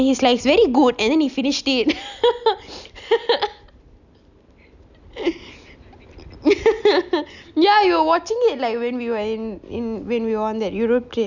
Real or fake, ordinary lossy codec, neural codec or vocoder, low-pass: real; none; none; 7.2 kHz